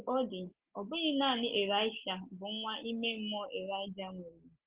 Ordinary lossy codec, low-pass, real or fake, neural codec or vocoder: Opus, 16 kbps; 3.6 kHz; real; none